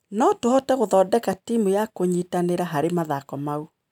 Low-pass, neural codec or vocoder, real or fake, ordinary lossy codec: 19.8 kHz; none; real; none